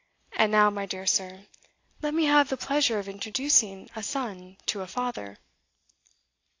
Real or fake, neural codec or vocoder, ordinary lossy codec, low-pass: real; none; AAC, 48 kbps; 7.2 kHz